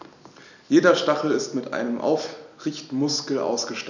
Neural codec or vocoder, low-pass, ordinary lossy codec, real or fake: none; 7.2 kHz; none; real